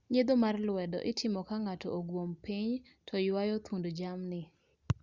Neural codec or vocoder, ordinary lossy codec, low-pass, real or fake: none; Opus, 64 kbps; 7.2 kHz; real